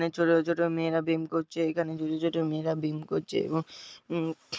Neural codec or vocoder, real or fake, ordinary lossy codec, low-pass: none; real; none; none